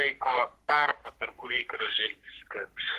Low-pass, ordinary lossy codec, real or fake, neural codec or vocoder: 14.4 kHz; Opus, 16 kbps; fake; codec, 44.1 kHz, 3.4 kbps, Pupu-Codec